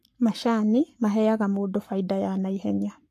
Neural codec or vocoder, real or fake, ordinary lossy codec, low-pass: codec, 44.1 kHz, 7.8 kbps, Pupu-Codec; fake; AAC, 64 kbps; 14.4 kHz